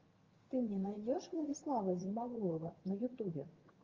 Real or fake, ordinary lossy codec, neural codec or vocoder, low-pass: fake; Opus, 32 kbps; vocoder, 22.05 kHz, 80 mel bands, HiFi-GAN; 7.2 kHz